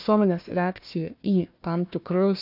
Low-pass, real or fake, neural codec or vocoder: 5.4 kHz; fake; codec, 16 kHz, 1 kbps, FunCodec, trained on Chinese and English, 50 frames a second